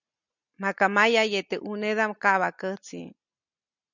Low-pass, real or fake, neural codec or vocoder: 7.2 kHz; real; none